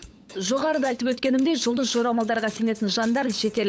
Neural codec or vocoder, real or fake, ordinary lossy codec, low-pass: codec, 16 kHz, 8 kbps, FunCodec, trained on LibriTTS, 25 frames a second; fake; none; none